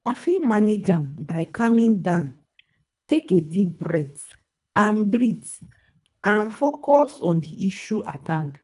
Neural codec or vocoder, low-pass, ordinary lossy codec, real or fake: codec, 24 kHz, 1.5 kbps, HILCodec; 10.8 kHz; none; fake